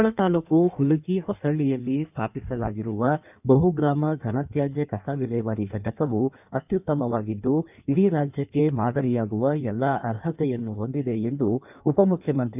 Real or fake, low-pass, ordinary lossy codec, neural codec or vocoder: fake; 3.6 kHz; none; codec, 16 kHz in and 24 kHz out, 1.1 kbps, FireRedTTS-2 codec